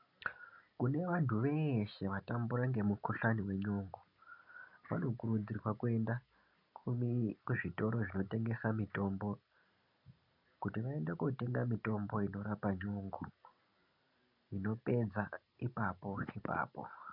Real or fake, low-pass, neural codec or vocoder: real; 5.4 kHz; none